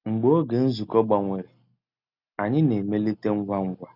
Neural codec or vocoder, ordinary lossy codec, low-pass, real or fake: none; none; 5.4 kHz; real